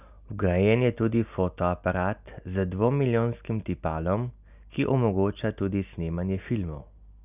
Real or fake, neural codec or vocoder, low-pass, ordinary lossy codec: real; none; 3.6 kHz; none